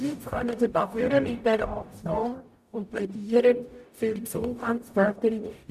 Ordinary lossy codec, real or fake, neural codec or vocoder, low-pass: none; fake; codec, 44.1 kHz, 0.9 kbps, DAC; 14.4 kHz